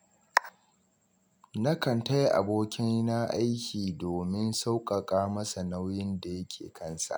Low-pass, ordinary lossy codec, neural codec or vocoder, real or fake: none; none; none; real